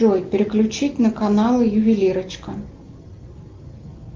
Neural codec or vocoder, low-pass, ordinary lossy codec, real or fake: none; 7.2 kHz; Opus, 32 kbps; real